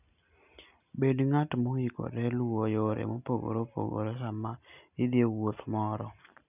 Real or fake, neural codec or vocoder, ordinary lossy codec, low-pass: real; none; none; 3.6 kHz